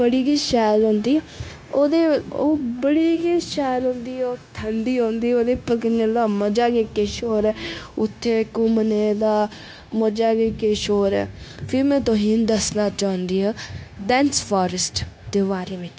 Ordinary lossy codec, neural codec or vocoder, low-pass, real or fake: none; codec, 16 kHz, 0.9 kbps, LongCat-Audio-Codec; none; fake